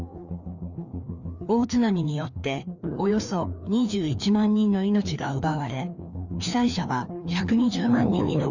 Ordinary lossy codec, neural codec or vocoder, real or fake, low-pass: none; codec, 16 kHz, 2 kbps, FreqCodec, larger model; fake; 7.2 kHz